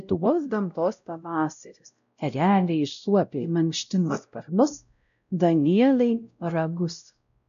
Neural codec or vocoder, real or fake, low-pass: codec, 16 kHz, 0.5 kbps, X-Codec, WavLM features, trained on Multilingual LibriSpeech; fake; 7.2 kHz